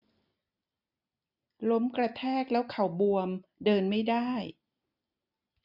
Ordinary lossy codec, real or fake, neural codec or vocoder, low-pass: none; real; none; 5.4 kHz